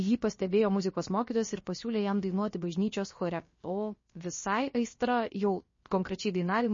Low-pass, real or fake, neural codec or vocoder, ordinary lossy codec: 7.2 kHz; fake; codec, 16 kHz, about 1 kbps, DyCAST, with the encoder's durations; MP3, 32 kbps